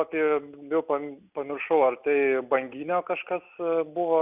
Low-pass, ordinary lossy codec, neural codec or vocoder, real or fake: 3.6 kHz; Opus, 64 kbps; none; real